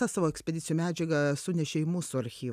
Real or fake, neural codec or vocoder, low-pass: real; none; 14.4 kHz